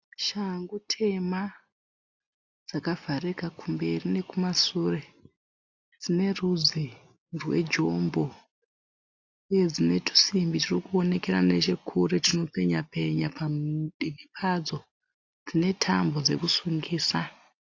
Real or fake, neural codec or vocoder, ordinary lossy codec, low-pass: real; none; AAC, 48 kbps; 7.2 kHz